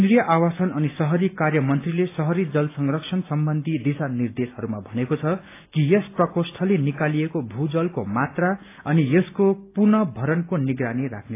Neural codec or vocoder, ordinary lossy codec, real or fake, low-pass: none; AAC, 24 kbps; real; 3.6 kHz